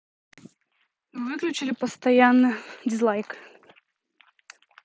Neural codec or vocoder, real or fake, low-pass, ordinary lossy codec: none; real; none; none